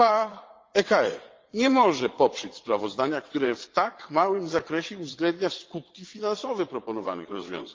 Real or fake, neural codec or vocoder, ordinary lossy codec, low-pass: fake; vocoder, 22.05 kHz, 80 mel bands, WaveNeXt; Opus, 32 kbps; 7.2 kHz